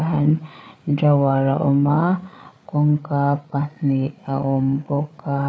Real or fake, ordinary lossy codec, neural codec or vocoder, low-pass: fake; none; codec, 16 kHz, 8 kbps, FreqCodec, larger model; none